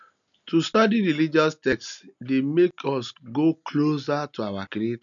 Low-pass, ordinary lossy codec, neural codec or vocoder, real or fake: 7.2 kHz; none; none; real